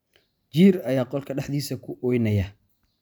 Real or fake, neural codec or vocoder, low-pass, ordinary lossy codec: real; none; none; none